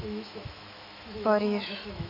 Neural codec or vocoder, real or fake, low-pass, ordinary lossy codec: autoencoder, 48 kHz, 128 numbers a frame, DAC-VAE, trained on Japanese speech; fake; 5.4 kHz; none